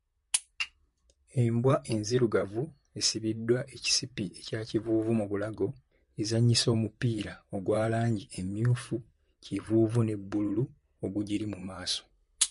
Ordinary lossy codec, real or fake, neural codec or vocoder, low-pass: MP3, 48 kbps; fake; vocoder, 44.1 kHz, 128 mel bands, Pupu-Vocoder; 14.4 kHz